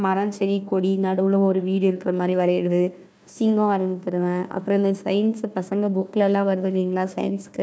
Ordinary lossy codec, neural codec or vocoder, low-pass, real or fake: none; codec, 16 kHz, 1 kbps, FunCodec, trained on Chinese and English, 50 frames a second; none; fake